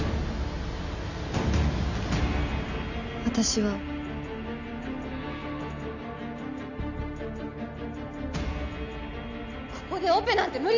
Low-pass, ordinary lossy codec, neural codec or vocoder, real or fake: 7.2 kHz; none; none; real